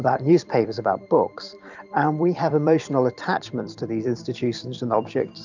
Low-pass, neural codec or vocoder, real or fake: 7.2 kHz; vocoder, 44.1 kHz, 128 mel bands every 256 samples, BigVGAN v2; fake